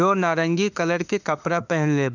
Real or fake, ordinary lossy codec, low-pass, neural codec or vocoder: fake; none; 7.2 kHz; autoencoder, 48 kHz, 32 numbers a frame, DAC-VAE, trained on Japanese speech